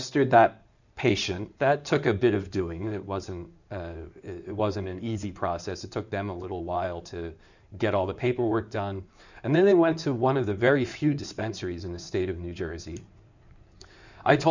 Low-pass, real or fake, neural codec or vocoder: 7.2 kHz; fake; codec, 16 kHz in and 24 kHz out, 2.2 kbps, FireRedTTS-2 codec